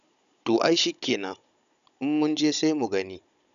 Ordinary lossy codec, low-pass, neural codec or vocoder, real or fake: MP3, 96 kbps; 7.2 kHz; codec, 16 kHz, 16 kbps, FunCodec, trained on Chinese and English, 50 frames a second; fake